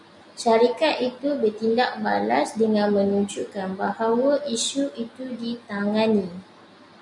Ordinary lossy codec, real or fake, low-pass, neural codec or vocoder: MP3, 96 kbps; real; 10.8 kHz; none